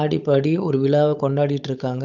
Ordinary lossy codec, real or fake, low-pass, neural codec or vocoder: none; fake; 7.2 kHz; codec, 16 kHz, 8 kbps, FunCodec, trained on Chinese and English, 25 frames a second